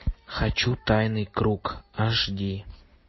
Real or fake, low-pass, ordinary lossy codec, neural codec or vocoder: real; 7.2 kHz; MP3, 24 kbps; none